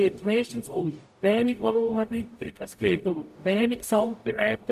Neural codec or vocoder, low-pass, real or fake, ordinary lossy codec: codec, 44.1 kHz, 0.9 kbps, DAC; 14.4 kHz; fake; AAC, 96 kbps